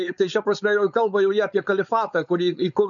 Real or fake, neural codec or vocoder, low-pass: fake; codec, 16 kHz, 4.8 kbps, FACodec; 7.2 kHz